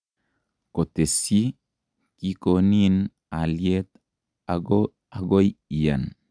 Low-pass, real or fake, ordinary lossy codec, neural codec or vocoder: 9.9 kHz; real; none; none